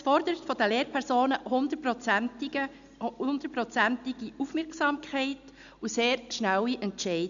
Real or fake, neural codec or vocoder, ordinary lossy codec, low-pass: real; none; none; 7.2 kHz